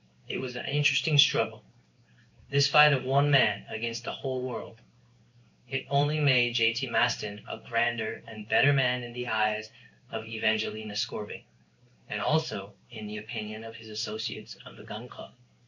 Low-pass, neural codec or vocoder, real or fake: 7.2 kHz; codec, 16 kHz in and 24 kHz out, 1 kbps, XY-Tokenizer; fake